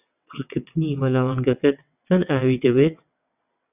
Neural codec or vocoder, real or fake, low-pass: vocoder, 22.05 kHz, 80 mel bands, WaveNeXt; fake; 3.6 kHz